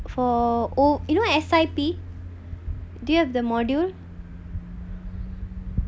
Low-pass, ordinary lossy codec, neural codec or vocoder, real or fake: none; none; none; real